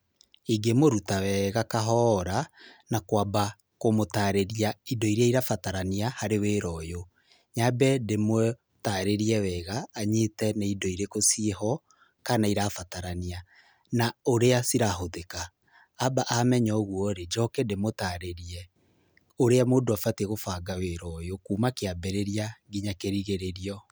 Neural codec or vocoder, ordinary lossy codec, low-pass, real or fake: none; none; none; real